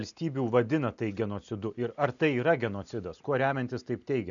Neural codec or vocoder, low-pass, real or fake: none; 7.2 kHz; real